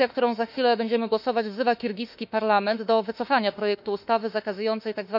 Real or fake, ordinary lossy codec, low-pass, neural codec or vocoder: fake; none; 5.4 kHz; autoencoder, 48 kHz, 32 numbers a frame, DAC-VAE, trained on Japanese speech